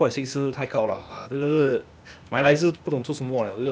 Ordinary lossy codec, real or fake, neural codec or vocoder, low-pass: none; fake; codec, 16 kHz, 0.8 kbps, ZipCodec; none